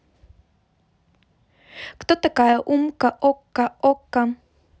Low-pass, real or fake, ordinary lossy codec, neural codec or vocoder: none; real; none; none